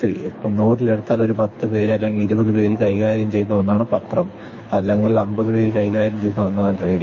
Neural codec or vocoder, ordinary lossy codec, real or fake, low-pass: codec, 24 kHz, 3 kbps, HILCodec; MP3, 32 kbps; fake; 7.2 kHz